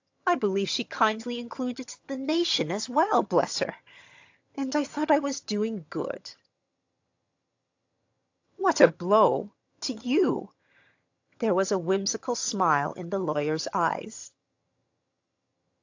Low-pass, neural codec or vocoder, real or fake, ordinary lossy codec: 7.2 kHz; vocoder, 22.05 kHz, 80 mel bands, HiFi-GAN; fake; AAC, 48 kbps